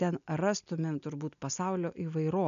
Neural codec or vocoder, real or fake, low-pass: none; real; 7.2 kHz